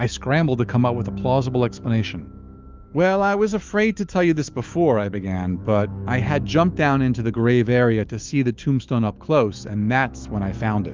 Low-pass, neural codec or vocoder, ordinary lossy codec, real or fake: 7.2 kHz; autoencoder, 48 kHz, 128 numbers a frame, DAC-VAE, trained on Japanese speech; Opus, 24 kbps; fake